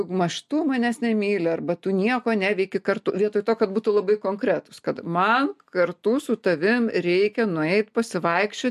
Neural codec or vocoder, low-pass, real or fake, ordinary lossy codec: none; 14.4 kHz; real; MP3, 64 kbps